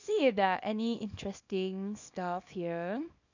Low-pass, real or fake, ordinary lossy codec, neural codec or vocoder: 7.2 kHz; fake; none; codec, 24 kHz, 0.9 kbps, WavTokenizer, small release